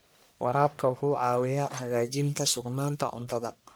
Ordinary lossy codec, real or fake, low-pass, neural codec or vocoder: none; fake; none; codec, 44.1 kHz, 1.7 kbps, Pupu-Codec